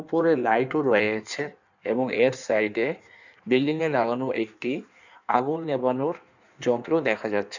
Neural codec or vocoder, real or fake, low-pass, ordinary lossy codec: codec, 16 kHz in and 24 kHz out, 1.1 kbps, FireRedTTS-2 codec; fake; 7.2 kHz; none